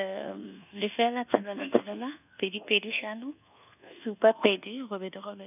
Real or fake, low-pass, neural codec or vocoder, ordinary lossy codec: fake; 3.6 kHz; codec, 24 kHz, 1.2 kbps, DualCodec; none